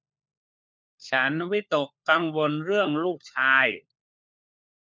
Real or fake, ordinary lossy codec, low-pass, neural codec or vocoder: fake; none; none; codec, 16 kHz, 4 kbps, FunCodec, trained on LibriTTS, 50 frames a second